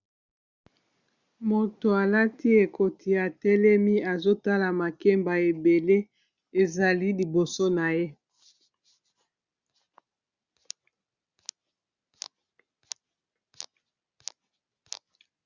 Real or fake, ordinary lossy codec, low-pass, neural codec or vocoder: real; Opus, 64 kbps; 7.2 kHz; none